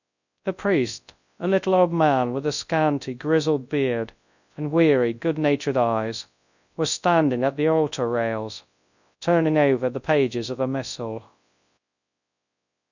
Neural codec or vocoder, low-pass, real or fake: codec, 24 kHz, 0.9 kbps, WavTokenizer, large speech release; 7.2 kHz; fake